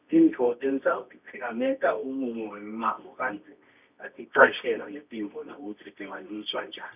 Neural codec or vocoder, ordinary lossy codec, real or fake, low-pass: codec, 24 kHz, 0.9 kbps, WavTokenizer, medium music audio release; none; fake; 3.6 kHz